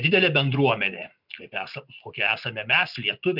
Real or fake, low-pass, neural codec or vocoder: real; 5.4 kHz; none